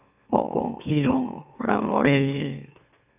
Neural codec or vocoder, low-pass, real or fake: autoencoder, 44.1 kHz, a latent of 192 numbers a frame, MeloTTS; 3.6 kHz; fake